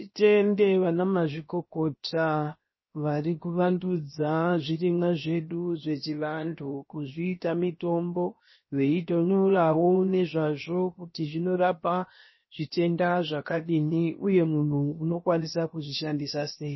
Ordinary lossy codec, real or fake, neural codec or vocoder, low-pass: MP3, 24 kbps; fake; codec, 16 kHz, 0.7 kbps, FocalCodec; 7.2 kHz